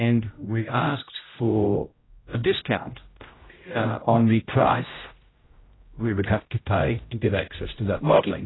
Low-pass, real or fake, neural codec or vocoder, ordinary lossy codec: 7.2 kHz; fake; codec, 16 kHz, 0.5 kbps, X-Codec, HuBERT features, trained on general audio; AAC, 16 kbps